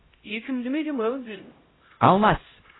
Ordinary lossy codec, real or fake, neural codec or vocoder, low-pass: AAC, 16 kbps; fake; codec, 16 kHz, 0.5 kbps, X-Codec, HuBERT features, trained on LibriSpeech; 7.2 kHz